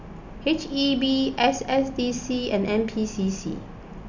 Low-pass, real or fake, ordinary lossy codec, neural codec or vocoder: 7.2 kHz; real; none; none